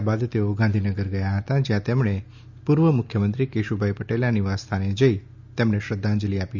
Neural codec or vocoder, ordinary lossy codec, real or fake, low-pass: none; none; real; 7.2 kHz